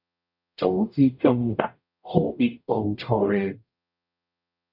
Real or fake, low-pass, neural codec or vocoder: fake; 5.4 kHz; codec, 44.1 kHz, 0.9 kbps, DAC